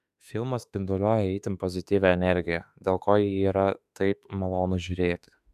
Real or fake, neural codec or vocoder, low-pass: fake; autoencoder, 48 kHz, 32 numbers a frame, DAC-VAE, trained on Japanese speech; 14.4 kHz